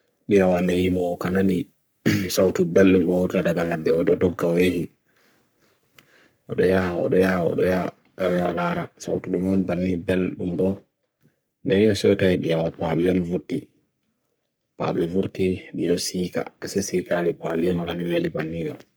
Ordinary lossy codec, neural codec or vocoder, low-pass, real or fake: none; codec, 44.1 kHz, 3.4 kbps, Pupu-Codec; none; fake